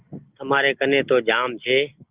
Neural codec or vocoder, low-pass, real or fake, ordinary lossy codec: none; 3.6 kHz; real; Opus, 32 kbps